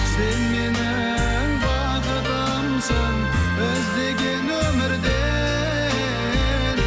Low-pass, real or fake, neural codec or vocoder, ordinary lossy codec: none; real; none; none